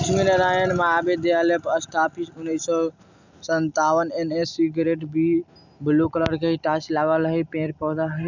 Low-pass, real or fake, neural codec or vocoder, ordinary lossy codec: 7.2 kHz; real; none; none